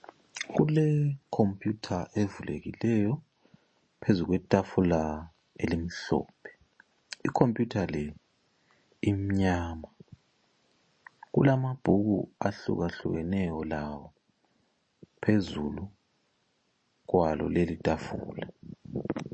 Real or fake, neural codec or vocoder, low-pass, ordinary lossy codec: real; none; 9.9 kHz; MP3, 32 kbps